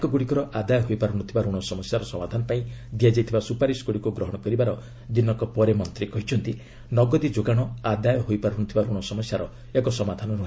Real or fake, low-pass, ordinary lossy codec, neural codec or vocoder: real; none; none; none